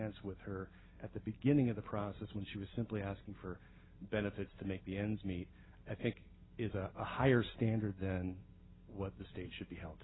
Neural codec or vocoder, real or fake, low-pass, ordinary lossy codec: none; real; 7.2 kHz; AAC, 16 kbps